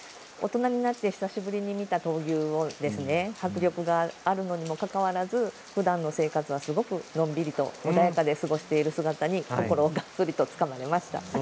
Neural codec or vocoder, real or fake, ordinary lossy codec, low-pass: none; real; none; none